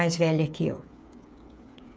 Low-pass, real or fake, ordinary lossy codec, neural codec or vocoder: none; fake; none; codec, 16 kHz, 8 kbps, FreqCodec, smaller model